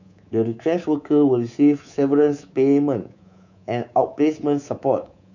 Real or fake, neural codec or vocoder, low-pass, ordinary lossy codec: fake; codec, 24 kHz, 3.1 kbps, DualCodec; 7.2 kHz; none